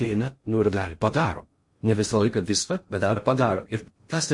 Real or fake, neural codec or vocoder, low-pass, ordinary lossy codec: fake; codec, 16 kHz in and 24 kHz out, 0.6 kbps, FocalCodec, streaming, 2048 codes; 10.8 kHz; MP3, 48 kbps